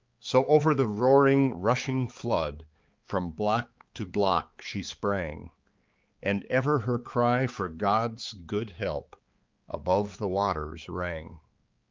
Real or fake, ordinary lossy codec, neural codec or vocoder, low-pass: fake; Opus, 24 kbps; codec, 16 kHz, 4 kbps, X-Codec, HuBERT features, trained on balanced general audio; 7.2 kHz